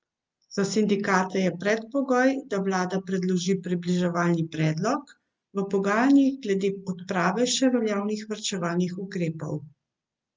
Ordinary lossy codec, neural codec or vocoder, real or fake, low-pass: Opus, 24 kbps; none; real; 7.2 kHz